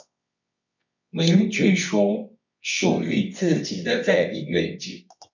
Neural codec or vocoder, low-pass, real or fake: codec, 24 kHz, 0.9 kbps, WavTokenizer, medium music audio release; 7.2 kHz; fake